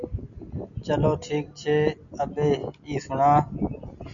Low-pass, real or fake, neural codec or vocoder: 7.2 kHz; real; none